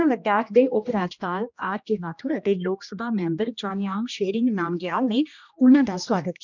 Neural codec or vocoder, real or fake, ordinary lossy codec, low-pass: codec, 16 kHz, 1 kbps, X-Codec, HuBERT features, trained on general audio; fake; none; 7.2 kHz